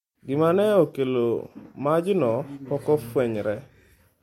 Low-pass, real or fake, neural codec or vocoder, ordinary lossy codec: 19.8 kHz; real; none; MP3, 64 kbps